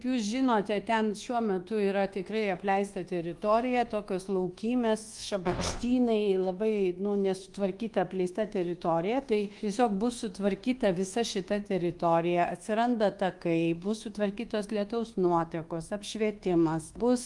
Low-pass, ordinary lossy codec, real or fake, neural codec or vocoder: 10.8 kHz; Opus, 24 kbps; fake; codec, 24 kHz, 1.2 kbps, DualCodec